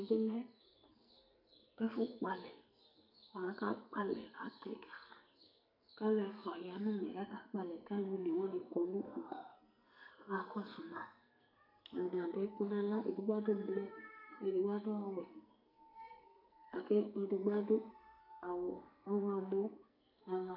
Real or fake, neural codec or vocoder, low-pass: fake; codec, 44.1 kHz, 2.6 kbps, SNAC; 5.4 kHz